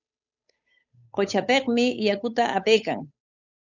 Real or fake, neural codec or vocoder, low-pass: fake; codec, 16 kHz, 8 kbps, FunCodec, trained on Chinese and English, 25 frames a second; 7.2 kHz